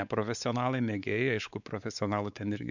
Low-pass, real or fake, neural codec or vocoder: 7.2 kHz; fake; codec, 16 kHz, 4 kbps, X-Codec, WavLM features, trained on Multilingual LibriSpeech